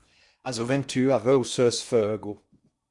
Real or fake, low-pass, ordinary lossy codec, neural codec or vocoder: fake; 10.8 kHz; Opus, 64 kbps; codec, 16 kHz in and 24 kHz out, 0.8 kbps, FocalCodec, streaming, 65536 codes